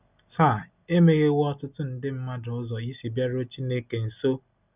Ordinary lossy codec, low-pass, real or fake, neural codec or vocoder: none; 3.6 kHz; real; none